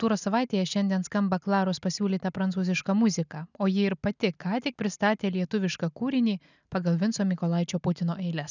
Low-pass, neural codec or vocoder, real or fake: 7.2 kHz; none; real